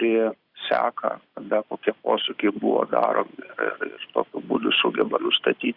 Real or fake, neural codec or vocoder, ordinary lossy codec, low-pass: real; none; Opus, 64 kbps; 5.4 kHz